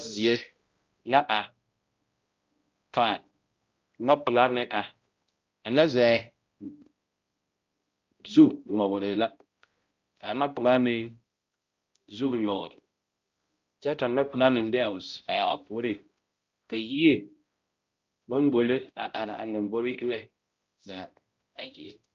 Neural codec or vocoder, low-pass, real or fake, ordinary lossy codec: codec, 16 kHz, 0.5 kbps, X-Codec, HuBERT features, trained on balanced general audio; 7.2 kHz; fake; Opus, 24 kbps